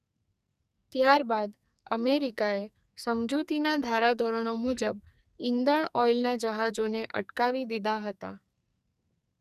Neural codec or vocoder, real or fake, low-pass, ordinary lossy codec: codec, 44.1 kHz, 2.6 kbps, SNAC; fake; 14.4 kHz; none